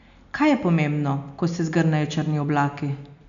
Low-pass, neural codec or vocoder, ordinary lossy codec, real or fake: 7.2 kHz; none; none; real